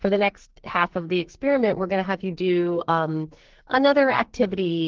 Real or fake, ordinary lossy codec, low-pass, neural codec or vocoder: fake; Opus, 16 kbps; 7.2 kHz; codec, 44.1 kHz, 2.6 kbps, SNAC